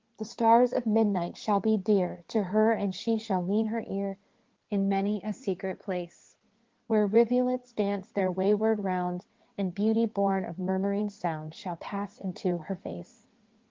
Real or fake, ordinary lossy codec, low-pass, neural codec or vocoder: fake; Opus, 16 kbps; 7.2 kHz; codec, 16 kHz in and 24 kHz out, 2.2 kbps, FireRedTTS-2 codec